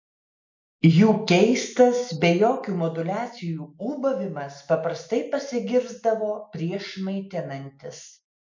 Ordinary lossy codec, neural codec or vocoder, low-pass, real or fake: MP3, 64 kbps; none; 7.2 kHz; real